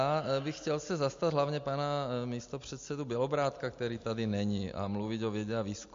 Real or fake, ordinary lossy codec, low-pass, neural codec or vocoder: real; MP3, 48 kbps; 7.2 kHz; none